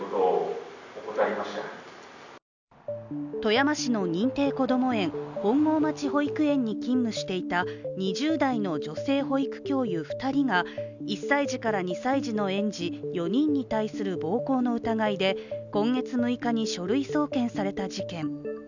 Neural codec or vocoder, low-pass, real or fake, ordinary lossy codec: none; 7.2 kHz; real; none